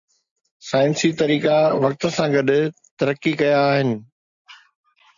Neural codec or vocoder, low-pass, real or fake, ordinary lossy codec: none; 7.2 kHz; real; MP3, 64 kbps